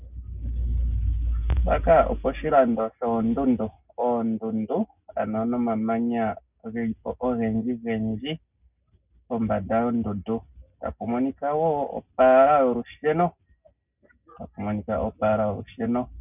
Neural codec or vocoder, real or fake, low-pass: none; real; 3.6 kHz